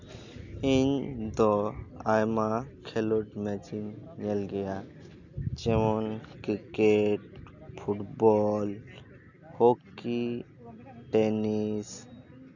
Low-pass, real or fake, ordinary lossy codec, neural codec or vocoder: 7.2 kHz; real; none; none